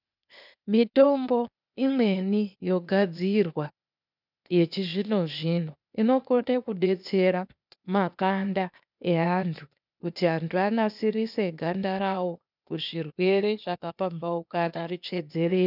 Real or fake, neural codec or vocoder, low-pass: fake; codec, 16 kHz, 0.8 kbps, ZipCodec; 5.4 kHz